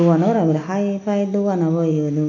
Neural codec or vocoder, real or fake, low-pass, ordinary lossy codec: none; real; 7.2 kHz; AAC, 48 kbps